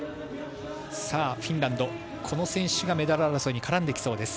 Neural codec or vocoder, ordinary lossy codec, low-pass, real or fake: none; none; none; real